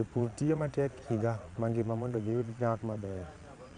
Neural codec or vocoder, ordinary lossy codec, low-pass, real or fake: vocoder, 22.05 kHz, 80 mel bands, Vocos; none; 9.9 kHz; fake